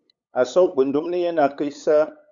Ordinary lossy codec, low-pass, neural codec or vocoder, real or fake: Opus, 64 kbps; 7.2 kHz; codec, 16 kHz, 8 kbps, FunCodec, trained on LibriTTS, 25 frames a second; fake